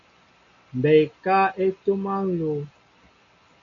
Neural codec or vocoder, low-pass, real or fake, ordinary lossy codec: none; 7.2 kHz; real; AAC, 64 kbps